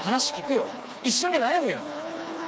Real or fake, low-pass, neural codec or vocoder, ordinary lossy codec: fake; none; codec, 16 kHz, 2 kbps, FreqCodec, smaller model; none